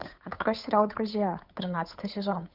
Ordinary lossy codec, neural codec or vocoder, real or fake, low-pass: Opus, 64 kbps; codec, 16 kHz, 8 kbps, FunCodec, trained on Chinese and English, 25 frames a second; fake; 5.4 kHz